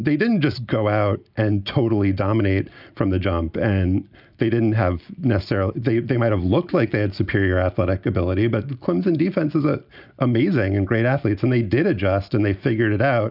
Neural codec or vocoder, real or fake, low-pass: none; real; 5.4 kHz